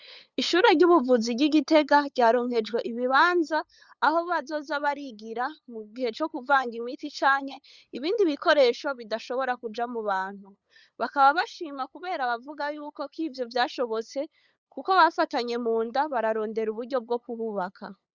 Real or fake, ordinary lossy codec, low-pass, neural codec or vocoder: fake; Opus, 64 kbps; 7.2 kHz; codec, 16 kHz, 8 kbps, FunCodec, trained on LibriTTS, 25 frames a second